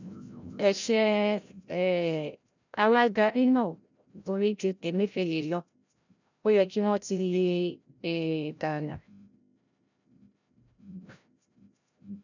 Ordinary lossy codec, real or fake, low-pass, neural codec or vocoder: none; fake; 7.2 kHz; codec, 16 kHz, 0.5 kbps, FreqCodec, larger model